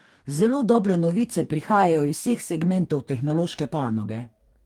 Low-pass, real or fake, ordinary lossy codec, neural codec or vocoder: 19.8 kHz; fake; Opus, 32 kbps; codec, 44.1 kHz, 2.6 kbps, DAC